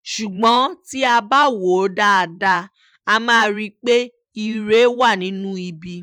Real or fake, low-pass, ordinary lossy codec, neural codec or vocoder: fake; 19.8 kHz; none; vocoder, 44.1 kHz, 128 mel bands every 512 samples, BigVGAN v2